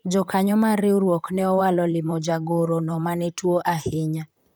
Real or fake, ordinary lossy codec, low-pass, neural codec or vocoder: fake; none; none; vocoder, 44.1 kHz, 128 mel bands, Pupu-Vocoder